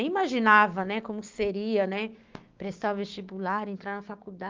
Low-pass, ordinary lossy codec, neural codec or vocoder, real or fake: 7.2 kHz; Opus, 32 kbps; codec, 16 kHz, 6 kbps, DAC; fake